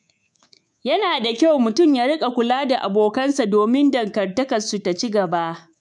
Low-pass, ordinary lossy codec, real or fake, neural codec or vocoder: 10.8 kHz; none; fake; codec, 24 kHz, 3.1 kbps, DualCodec